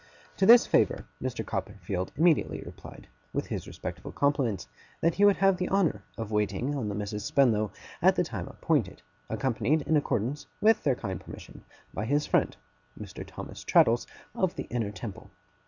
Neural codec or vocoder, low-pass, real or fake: none; 7.2 kHz; real